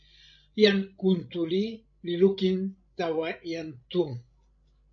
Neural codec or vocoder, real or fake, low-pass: codec, 16 kHz, 16 kbps, FreqCodec, larger model; fake; 7.2 kHz